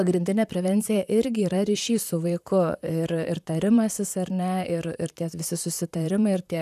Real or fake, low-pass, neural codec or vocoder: fake; 14.4 kHz; vocoder, 44.1 kHz, 128 mel bands, Pupu-Vocoder